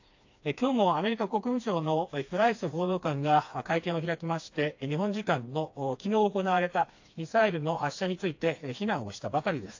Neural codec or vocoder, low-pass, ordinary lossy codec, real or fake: codec, 16 kHz, 2 kbps, FreqCodec, smaller model; 7.2 kHz; AAC, 48 kbps; fake